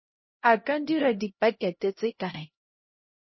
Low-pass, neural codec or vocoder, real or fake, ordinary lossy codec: 7.2 kHz; codec, 16 kHz, 0.5 kbps, X-Codec, HuBERT features, trained on LibriSpeech; fake; MP3, 24 kbps